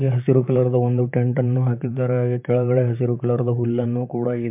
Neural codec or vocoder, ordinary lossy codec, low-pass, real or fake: vocoder, 44.1 kHz, 128 mel bands, Pupu-Vocoder; MP3, 32 kbps; 3.6 kHz; fake